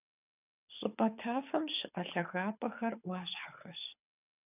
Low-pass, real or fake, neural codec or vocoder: 3.6 kHz; fake; codec, 24 kHz, 6 kbps, HILCodec